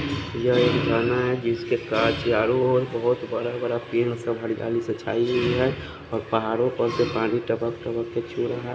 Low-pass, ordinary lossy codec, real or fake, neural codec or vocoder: none; none; real; none